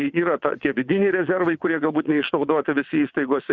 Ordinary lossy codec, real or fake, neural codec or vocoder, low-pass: Opus, 64 kbps; fake; vocoder, 22.05 kHz, 80 mel bands, WaveNeXt; 7.2 kHz